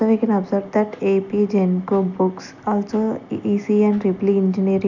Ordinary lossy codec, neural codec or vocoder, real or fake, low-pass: none; none; real; 7.2 kHz